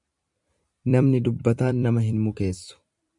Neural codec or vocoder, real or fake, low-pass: vocoder, 44.1 kHz, 128 mel bands every 256 samples, BigVGAN v2; fake; 10.8 kHz